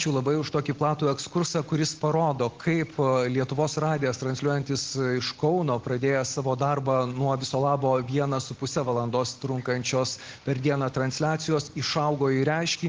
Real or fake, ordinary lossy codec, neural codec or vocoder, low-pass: real; Opus, 16 kbps; none; 7.2 kHz